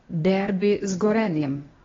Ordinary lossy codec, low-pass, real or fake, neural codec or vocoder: AAC, 24 kbps; 7.2 kHz; fake; codec, 16 kHz, 0.8 kbps, ZipCodec